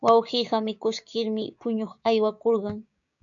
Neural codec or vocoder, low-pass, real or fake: codec, 16 kHz, 6 kbps, DAC; 7.2 kHz; fake